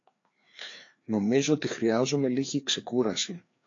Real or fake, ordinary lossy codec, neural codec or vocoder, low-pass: fake; AAC, 48 kbps; codec, 16 kHz, 2 kbps, FreqCodec, larger model; 7.2 kHz